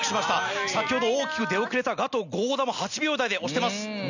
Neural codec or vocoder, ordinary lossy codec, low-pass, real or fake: none; none; 7.2 kHz; real